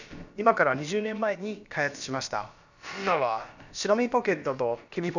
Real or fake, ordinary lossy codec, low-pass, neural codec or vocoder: fake; none; 7.2 kHz; codec, 16 kHz, about 1 kbps, DyCAST, with the encoder's durations